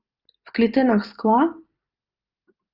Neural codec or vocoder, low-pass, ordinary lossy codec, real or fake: none; 5.4 kHz; Opus, 24 kbps; real